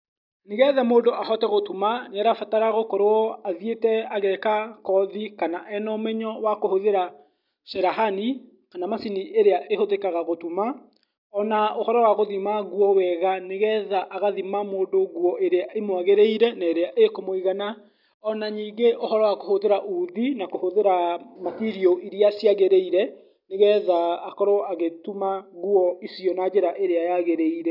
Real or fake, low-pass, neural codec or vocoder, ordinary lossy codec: real; 5.4 kHz; none; none